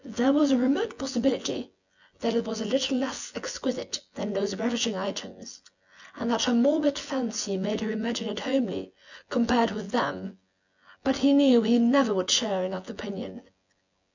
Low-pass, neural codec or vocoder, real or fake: 7.2 kHz; vocoder, 24 kHz, 100 mel bands, Vocos; fake